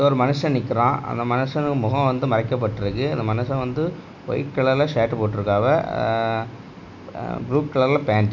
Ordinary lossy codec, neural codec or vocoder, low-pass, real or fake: none; none; 7.2 kHz; real